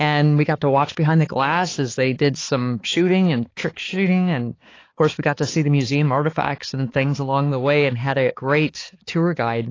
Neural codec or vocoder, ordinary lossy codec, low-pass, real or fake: codec, 16 kHz, 4 kbps, X-Codec, HuBERT features, trained on balanced general audio; AAC, 32 kbps; 7.2 kHz; fake